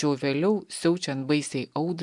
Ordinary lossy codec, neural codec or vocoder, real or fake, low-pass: MP3, 96 kbps; none; real; 10.8 kHz